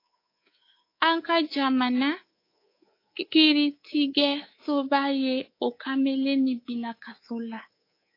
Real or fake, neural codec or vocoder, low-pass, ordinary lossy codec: fake; codec, 24 kHz, 3.1 kbps, DualCodec; 5.4 kHz; AAC, 32 kbps